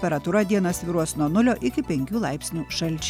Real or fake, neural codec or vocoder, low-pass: real; none; 19.8 kHz